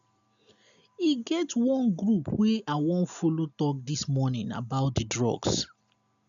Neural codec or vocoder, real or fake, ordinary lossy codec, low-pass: none; real; none; 7.2 kHz